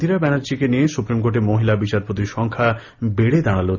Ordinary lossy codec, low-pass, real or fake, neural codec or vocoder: none; 7.2 kHz; real; none